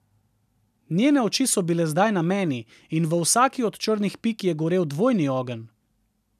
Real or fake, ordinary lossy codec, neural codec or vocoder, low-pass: real; none; none; 14.4 kHz